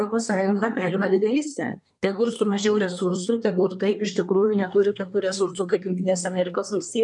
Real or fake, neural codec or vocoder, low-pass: fake; codec, 24 kHz, 1 kbps, SNAC; 10.8 kHz